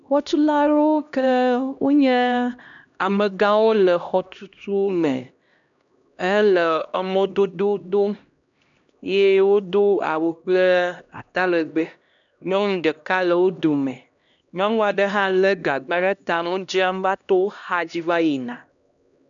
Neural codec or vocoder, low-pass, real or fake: codec, 16 kHz, 1 kbps, X-Codec, HuBERT features, trained on LibriSpeech; 7.2 kHz; fake